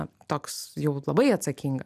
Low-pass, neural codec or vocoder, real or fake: 14.4 kHz; none; real